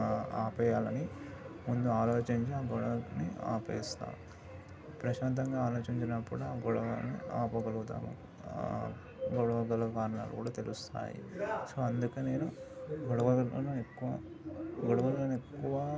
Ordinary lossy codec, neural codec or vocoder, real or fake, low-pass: none; none; real; none